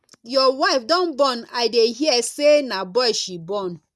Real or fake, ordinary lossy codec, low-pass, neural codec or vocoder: real; none; none; none